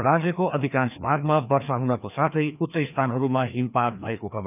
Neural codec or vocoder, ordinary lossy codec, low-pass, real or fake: codec, 16 kHz, 2 kbps, FreqCodec, larger model; none; 3.6 kHz; fake